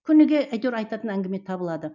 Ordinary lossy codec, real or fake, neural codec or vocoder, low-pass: none; real; none; 7.2 kHz